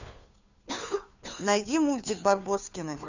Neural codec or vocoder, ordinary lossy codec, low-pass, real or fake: codec, 16 kHz, 2 kbps, FunCodec, trained on Chinese and English, 25 frames a second; none; 7.2 kHz; fake